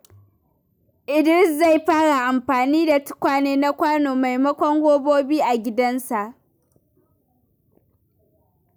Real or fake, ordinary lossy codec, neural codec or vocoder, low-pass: real; none; none; none